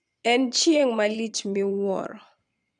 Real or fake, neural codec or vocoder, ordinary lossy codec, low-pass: fake; vocoder, 22.05 kHz, 80 mel bands, Vocos; none; 9.9 kHz